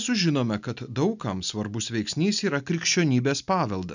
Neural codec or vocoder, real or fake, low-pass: none; real; 7.2 kHz